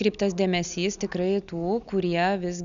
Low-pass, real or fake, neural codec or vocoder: 7.2 kHz; real; none